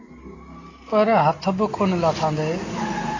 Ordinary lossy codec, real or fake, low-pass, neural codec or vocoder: AAC, 32 kbps; real; 7.2 kHz; none